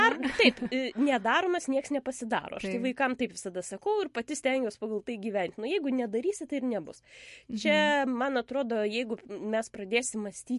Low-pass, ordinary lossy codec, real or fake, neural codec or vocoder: 14.4 kHz; MP3, 48 kbps; real; none